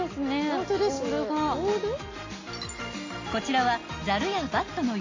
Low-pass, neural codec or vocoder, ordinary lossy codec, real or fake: 7.2 kHz; none; AAC, 32 kbps; real